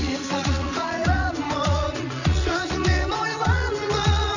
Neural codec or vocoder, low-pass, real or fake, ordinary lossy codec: vocoder, 44.1 kHz, 80 mel bands, Vocos; 7.2 kHz; fake; none